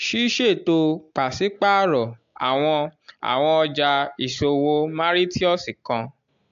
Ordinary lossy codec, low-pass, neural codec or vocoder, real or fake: AAC, 64 kbps; 7.2 kHz; none; real